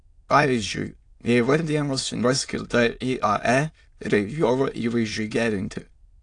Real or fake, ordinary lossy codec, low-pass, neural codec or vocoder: fake; AAC, 48 kbps; 9.9 kHz; autoencoder, 22.05 kHz, a latent of 192 numbers a frame, VITS, trained on many speakers